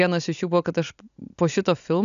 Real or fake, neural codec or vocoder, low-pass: real; none; 7.2 kHz